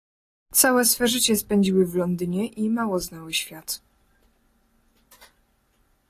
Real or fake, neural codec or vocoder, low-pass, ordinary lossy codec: real; none; 14.4 kHz; AAC, 48 kbps